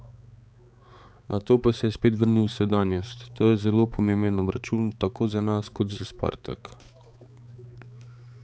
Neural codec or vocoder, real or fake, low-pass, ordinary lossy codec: codec, 16 kHz, 4 kbps, X-Codec, HuBERT features, trained on balanced general audio; fake; none; none